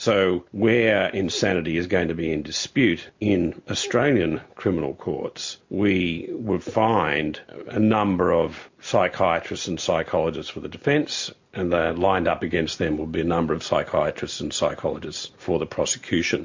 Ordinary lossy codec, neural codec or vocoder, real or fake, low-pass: MP3, 48 kbps; none; real; 7.2 kHz